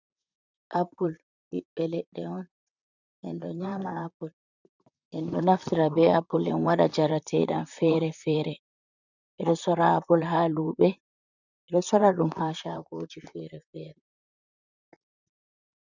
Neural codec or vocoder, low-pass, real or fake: vocoder, 44.1 kHz, 128 mel bands, Pupu-Vocoder; 7.2 kHz; fake